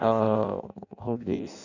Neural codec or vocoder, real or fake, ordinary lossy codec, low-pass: codec, 16 kHz in and 24 kHz out, 0.6 kbps, FireRedTTS-2 codec; fake; none; 7.2 kHz